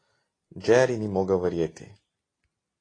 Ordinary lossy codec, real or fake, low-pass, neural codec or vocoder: AAC, 32 kbps; real; 9.9 kHz; none